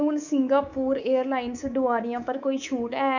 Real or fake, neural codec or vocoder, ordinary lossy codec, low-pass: fake; codec, 24 kHz, 3.1 kbps, DualCodec; none; 7.2 kHz